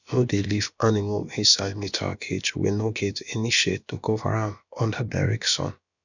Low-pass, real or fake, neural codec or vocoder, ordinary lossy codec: 7.2 kHz; fake; codec, 16 kHz, about 1 kbps, DyCAST, with the encoder's durations; none